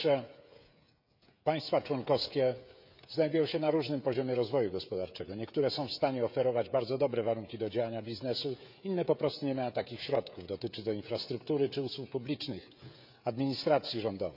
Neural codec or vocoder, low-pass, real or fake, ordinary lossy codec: codec, 16 kHz, 16 kbps, FreqCodec, smaller model; 5.4 kHz; fake; none